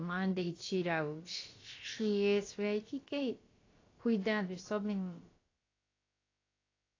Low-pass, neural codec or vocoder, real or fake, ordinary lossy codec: 7.2 kHz; codec, 16 kHz, about 1 kbps, DyCAST, with the encoder's durations; fake; AAC, 32 kbps